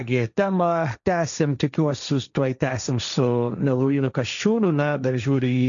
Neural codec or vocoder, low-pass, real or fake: codec, 16 kHz, 1.1 kbps, Voila-Tokenizer; 7.2 kHz; fake